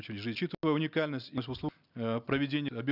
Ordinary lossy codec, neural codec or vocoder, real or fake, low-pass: none; none; real; 5.4 kHz